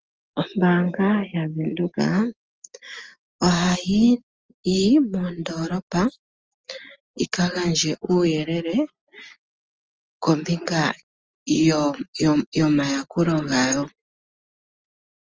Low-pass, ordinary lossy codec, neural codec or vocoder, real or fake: 7.2 kHz; Opus, 24 kbps; none; real